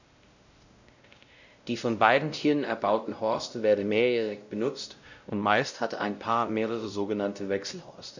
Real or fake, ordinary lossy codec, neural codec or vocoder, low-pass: fake; none; codec, 16 kHz, 0.5 kbps, X-Codec, WavLM features, trained on Multilingual LibriSpeech; 7.2 kHz